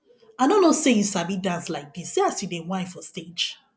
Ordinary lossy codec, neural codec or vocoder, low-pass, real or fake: none; none; none; real